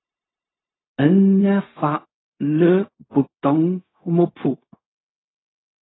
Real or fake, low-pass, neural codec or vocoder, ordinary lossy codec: fake; 7.2 kHz; codec, 16 kHz, 0.4 kbps, LongCat-Audio-Codec; AAC, 16 kbps